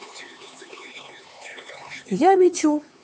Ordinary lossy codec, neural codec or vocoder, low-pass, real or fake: none; codec, 16 kHz, 4 kbps, X-Codec, HuBERT features, trained on LibriSpeech; none; fake